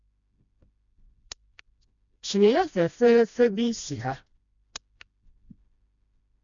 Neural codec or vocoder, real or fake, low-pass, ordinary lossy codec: codec, 16 kHz, 1 kbps, FreqCodec, smaller model; fake; 7.2 kHz; none